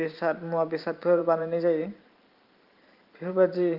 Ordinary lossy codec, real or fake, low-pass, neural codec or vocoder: Opus, 32 kbps; fake; 5.4 kHz; vocoder, 44.1 kHz, 128 mel bands every 512 samples, BigVGAN v2